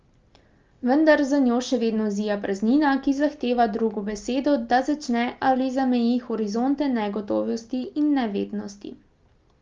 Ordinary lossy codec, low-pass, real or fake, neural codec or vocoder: Opus, 32 kbps; 7.2 kHz; real; none